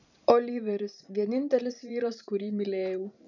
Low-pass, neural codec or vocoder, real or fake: 7.2 kHz; vocoder, 44.1 kHz, 128 mel bands every 512 samples, BigVGAN v2; fake